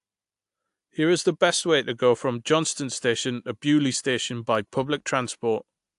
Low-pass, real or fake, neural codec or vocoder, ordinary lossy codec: 10.8 kHz; real; none; AAC, 64 kbps